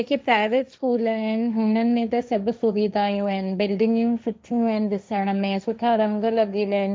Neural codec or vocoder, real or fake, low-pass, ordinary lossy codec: codec, 16 kHz, 1.1 kbps, Voila-Tokenizer; fake; none; none